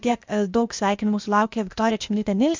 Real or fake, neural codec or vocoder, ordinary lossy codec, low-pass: fake; codec, 16 kHz, 0.8 kbps, ZipCodec; MP3, 64 kbps; 7.2 kHz